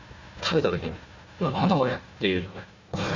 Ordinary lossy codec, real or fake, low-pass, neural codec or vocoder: MP3, 48 kbps; fake; 7.2 kHz; codec, 16 kHz, 1 kbps, FunCodec, trained on Chinese and English, 50 frames a second